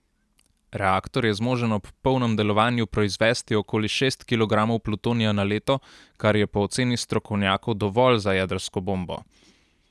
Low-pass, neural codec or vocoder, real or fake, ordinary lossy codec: none; none; real; none